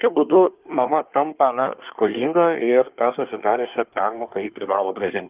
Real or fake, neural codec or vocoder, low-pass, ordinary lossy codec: fake; codec, 24 kHz, 1 kbps, SNAC; 3.6 kHz; Opus, 24 kbps